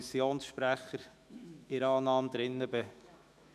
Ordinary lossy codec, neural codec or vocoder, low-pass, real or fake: none; autoencoder, 48 kHz, 128 numbers a frame, DAC-VAE, trained on Japanese speech; 14.4 kHz; fake